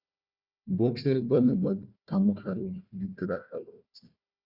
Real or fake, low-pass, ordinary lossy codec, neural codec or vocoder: fake; 5.4 kHz; Opus, 64 kbps; codec, 16 kHz, 1 kbps, FunCodec, trained on Chinese and English, 50 frames a second